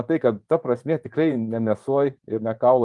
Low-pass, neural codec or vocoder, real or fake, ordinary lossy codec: 10.8 kHz; vocoder, 24 kHz, 100 mel bands, Vocos; fake; Opus, 32 kbps